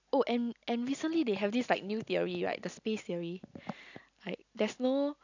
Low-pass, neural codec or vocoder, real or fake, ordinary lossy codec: 7.2 kHz; none; real; none